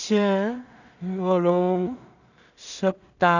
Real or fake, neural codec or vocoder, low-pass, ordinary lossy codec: fake; codec, 16 kHz in and 24 kHz out, 0.4 kbps, LongCat-Audio-Codec, two codebook decoder; 7.2 kHz; none